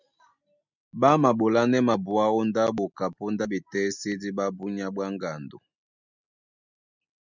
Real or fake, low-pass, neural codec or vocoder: real; 7.2 kHz; none